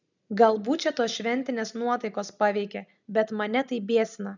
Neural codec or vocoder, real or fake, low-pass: none; real; 7.2 kHz